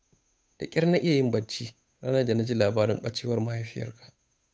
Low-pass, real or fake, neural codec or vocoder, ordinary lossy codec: none; fake; codec, 16 kHz, 8 kbps, FunCodec, trained on Chinese and English, 25 frames a second; none